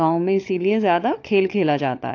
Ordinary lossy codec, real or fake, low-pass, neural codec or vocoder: AAC, 48 kbps; fake; 7.2 kHz; codec, 16 kHz, 16 kbps, FunCodec, trained on LibriTTS, 50 frames a second